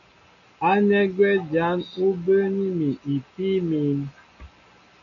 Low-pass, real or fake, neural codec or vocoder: 7.2 kHz; real; none